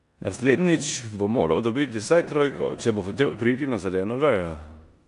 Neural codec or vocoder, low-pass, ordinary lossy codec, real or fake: codec, 16 kHz in and 24 kHz out, 0.9 kbps, LongCat-Audio-Codec, four codebook decoder; 10.8 kHz; AAC, 64 kbps; fake